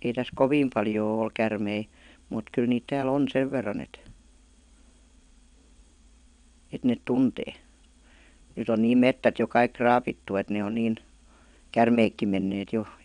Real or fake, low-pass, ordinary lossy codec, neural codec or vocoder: fake; 9.9 kHz; none; vocoder, 22.05 kHz, 80 mel bands, WaveNeXt